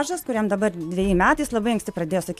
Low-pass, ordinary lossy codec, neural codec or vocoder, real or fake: 14.4 kHz; AAC, 96 kbps; none; real